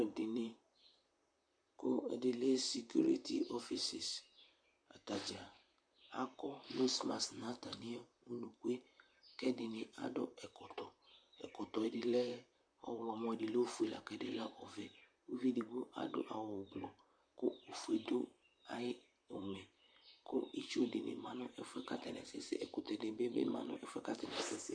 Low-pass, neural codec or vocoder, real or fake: 9.9 kHz; vocoder, 44.1 kHz, 128 mel bands, Pupu-Vocoder; fake